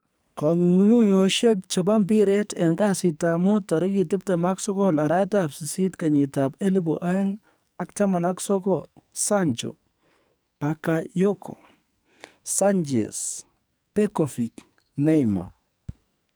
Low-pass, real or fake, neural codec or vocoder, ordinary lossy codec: none; fake; codec, 44.1 kHz, 2.6 kbps, SNAC; none